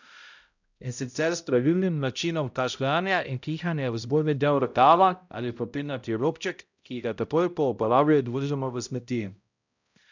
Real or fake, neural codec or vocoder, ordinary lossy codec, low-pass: fake; codec, 16 kHz, 0.5 kbps, X-Codec, HuBERT features, trained on balanced general audio; none; 7.2 kHz